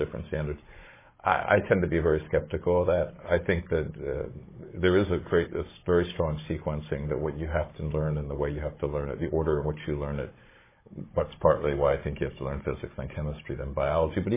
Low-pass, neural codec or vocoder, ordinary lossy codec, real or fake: 3.6 kHz; codec, 16 kHz, 16 kbps, FunCodec, trained on Chinese and English, 50 frames a second; MP3, 16 kbps; fake